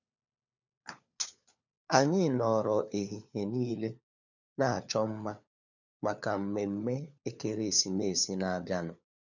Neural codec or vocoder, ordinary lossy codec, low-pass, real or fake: codec, 16 kHz, 4 kbps, FunCodec, trained on LibriTTS, 50 frames a second; none; 7.2 kHz; fake